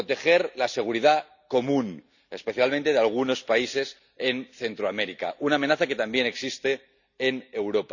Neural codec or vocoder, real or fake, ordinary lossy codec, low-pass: none; real; none; 7.2 kHz